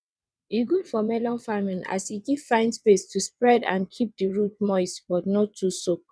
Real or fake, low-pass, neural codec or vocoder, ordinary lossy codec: fake; none; vocoder, 22.05 kHz, 80 mel bands, WaveNeXt; none